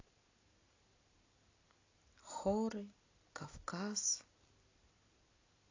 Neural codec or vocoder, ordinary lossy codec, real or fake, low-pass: none; AAC, 32 kbps; real; 7.2 kHz